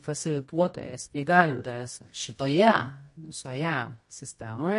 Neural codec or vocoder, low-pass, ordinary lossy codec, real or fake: codec, 24 kHz, 0.9 kbps, WavTokenizer, medium music audio release; 10.8 kHz; MP3, 48 kbps; fake